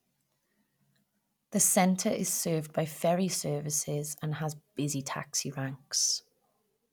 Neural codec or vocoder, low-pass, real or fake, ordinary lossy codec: none; none; real; none